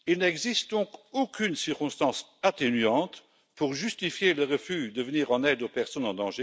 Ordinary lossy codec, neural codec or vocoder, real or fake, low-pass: none; none; real; none